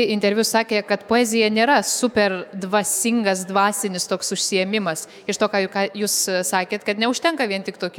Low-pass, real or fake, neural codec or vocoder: 19.8 kHz; fake; autoencoder, 48 kHz, 128 numbers a frame, DAC-VAE, trained on Japanese speech